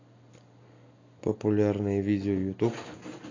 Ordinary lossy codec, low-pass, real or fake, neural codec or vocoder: AAC, 32 kbps; 7.2 kHz; real; none